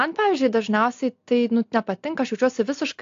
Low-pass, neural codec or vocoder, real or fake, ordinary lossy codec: 7.2 kHz; none; real; AAC, 48 kbps